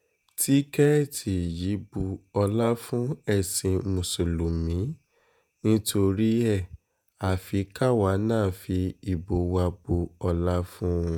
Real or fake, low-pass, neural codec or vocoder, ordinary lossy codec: fake; none; vocoder, 48 kHz, 128 mel bands, Vocos; none